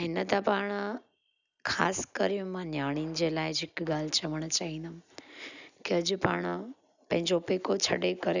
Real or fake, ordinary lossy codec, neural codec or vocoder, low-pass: real; none; none; 7.2 kHz